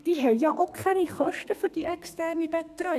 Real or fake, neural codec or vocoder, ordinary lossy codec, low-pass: fake; codec, 32 kHz, 1.9 kbps, SNAC; none; 14.4 kHz